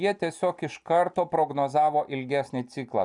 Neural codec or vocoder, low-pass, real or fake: none; 10.8 kHz; real